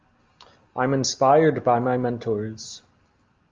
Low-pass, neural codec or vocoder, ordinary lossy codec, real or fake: 7.2 kHz; none; Opus, 32 kbps; real